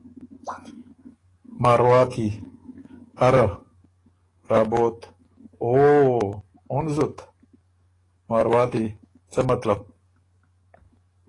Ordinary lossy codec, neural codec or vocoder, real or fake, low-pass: AAC, 32 kbps; codec, 44.1 kHz, 7.8 kbps, DAC; fake; 10.8 kHz